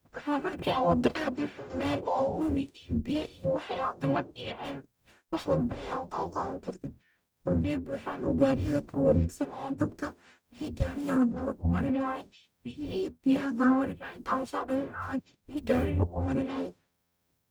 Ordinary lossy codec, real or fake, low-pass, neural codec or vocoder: none; fake; none; codec, 44.1 kHz, 0.9 kbps, DAC